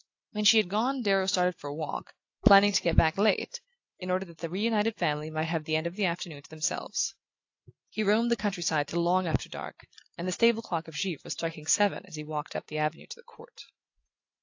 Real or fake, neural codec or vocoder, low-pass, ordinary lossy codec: real; none; 7.2 kHz; AAC, 48 kbps